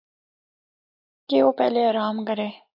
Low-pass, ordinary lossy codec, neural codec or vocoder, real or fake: 5.4 kHz; AAC, 48 kbps; none; real